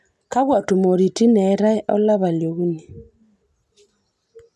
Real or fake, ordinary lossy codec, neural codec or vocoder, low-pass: real; none; none; none